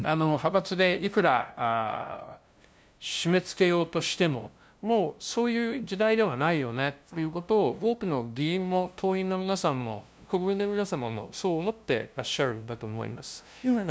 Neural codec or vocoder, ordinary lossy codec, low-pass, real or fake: codec, 16 kHz, 0.5 kbps, FunCodec, trained on LibriTTS, 25 frames a second; none; none; fake